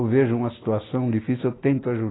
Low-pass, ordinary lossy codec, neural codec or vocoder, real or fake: 7.2 kHz; AAC, 16 kbps; none; real